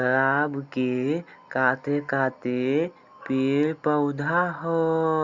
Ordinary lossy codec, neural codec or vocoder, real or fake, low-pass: Opus, 64 kbps; none; real; 7.2 kHz